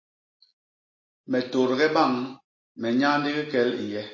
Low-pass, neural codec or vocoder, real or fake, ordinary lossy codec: 7.2 kHz; none; real; MP3, 32 kbps